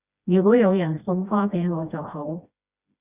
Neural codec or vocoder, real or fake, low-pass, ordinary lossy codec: codec, 16 kHz, 1 kbps, FreqCodec, smaller model; fake; 3.6 kHz; Opus, 64 kbps